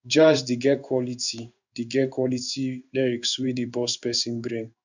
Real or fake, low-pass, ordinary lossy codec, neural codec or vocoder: fake; 7.2 kHz; none; codec, 16 kHz in and 24 kHz out, 1 kbps, XY-Tokenizer